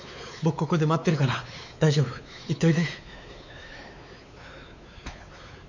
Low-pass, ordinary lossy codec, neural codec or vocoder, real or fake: 7.2 kHz; none; codec, 16 kHz, 4 kbps, X-Codec, WavLM features, trained on Multilingual LibriSpeech; fake